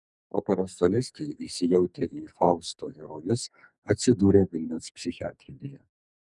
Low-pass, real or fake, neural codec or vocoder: 10.8 kHz; fake; codec, 44.1 kHz, 2.6 kbps, SNAC